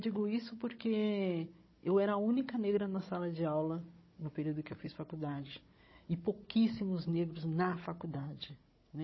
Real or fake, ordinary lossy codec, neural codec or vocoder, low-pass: fake; MP3, 24 kbps; codec, 16 kHz, 16 kbps, FunCodec, trained on Chinese and English, 50 frames a second; 7.2 kHz